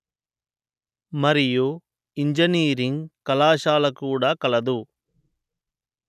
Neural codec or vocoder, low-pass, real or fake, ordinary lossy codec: none; 14.4 kHz; real; none